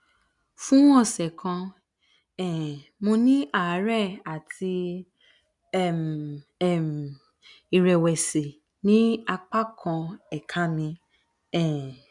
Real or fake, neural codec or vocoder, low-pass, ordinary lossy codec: real; none; 10.8 kHz; none